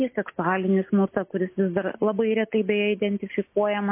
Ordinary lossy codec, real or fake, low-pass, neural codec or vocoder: MP3, 24 kbps; real; 3.6 kHz; none